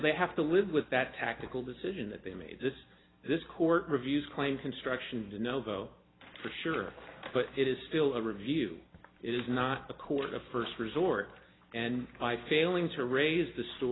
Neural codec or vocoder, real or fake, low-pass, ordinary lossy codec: none; real; 7.2 kHz; AAC, 16 kbps